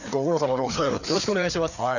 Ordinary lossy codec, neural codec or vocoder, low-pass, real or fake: none; codec, 16 kHz, 4 kbps, FreqCodec, larger model; 7.2 kHz; fake